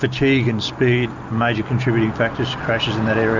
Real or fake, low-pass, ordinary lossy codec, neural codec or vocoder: real; 7.2 kHz; Opus, 64 kbps; none